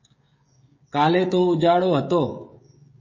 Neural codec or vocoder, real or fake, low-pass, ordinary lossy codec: codec, 16 kHz, 16 kbps, FreqCodec, smaller model; fake; 7.2 kHz; MP3, 32 kbps